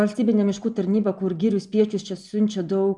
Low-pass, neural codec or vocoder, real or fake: 10.8 kHz; none; real